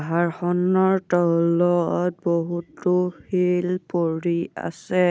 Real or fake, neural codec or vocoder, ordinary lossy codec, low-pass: real; none; none; none